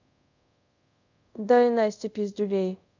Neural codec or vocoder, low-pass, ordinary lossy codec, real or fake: codec, 24 kHz, 0.5 kbps, DualCodec; 7.2 kHz; none; fake